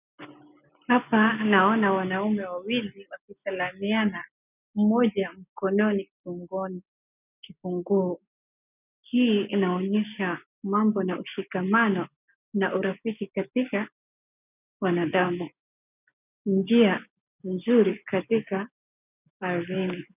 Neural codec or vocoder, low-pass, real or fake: none; 3.6 kHz; real